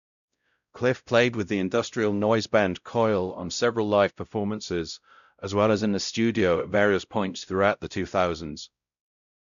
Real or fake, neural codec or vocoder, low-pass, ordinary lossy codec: fake; codec, 16 kHz, 0.5 kbps, X-Codec, WavLM features, trained on Multilingual LibriSpeech; 7.2 kHz; none